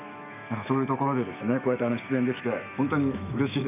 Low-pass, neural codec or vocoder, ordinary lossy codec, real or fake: 3.6 kHz; autoencoder, 48 kHz, 128 numbers a frame, DAC-VAE, trained on Japanese speech; none; fake